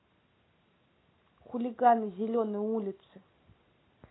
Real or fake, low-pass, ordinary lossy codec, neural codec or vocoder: real; 7.2 kHz; AAC, 16 kbps; none